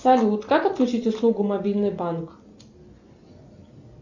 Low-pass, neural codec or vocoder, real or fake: 7.2 kHz; none; real